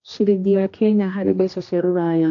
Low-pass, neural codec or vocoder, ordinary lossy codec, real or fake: 7.2 kHz; codec, 16 kHz, 1 kbps, X-Codec, HuBERT features, trained on general audio; AAC, 48 kbps; fake